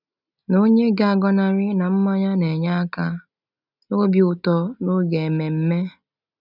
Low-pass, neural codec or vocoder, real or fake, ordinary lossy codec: 5.4 kHz; none; real; none